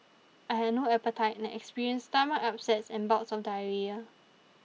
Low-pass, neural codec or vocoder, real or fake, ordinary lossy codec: none; none; real; none